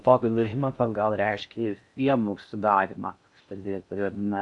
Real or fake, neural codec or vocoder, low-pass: fake; codec, 16 kHz in and 24 kHz out, 0.6 kbps, FocalCodec, streaming, 4096 codes; 10.8 kHz